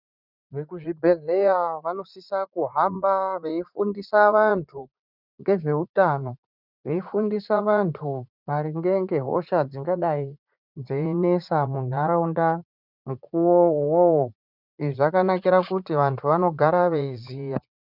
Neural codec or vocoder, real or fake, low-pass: vocoder, 44.1 kHz, 80 mel bands, Vocos; fake; 5.4 kHz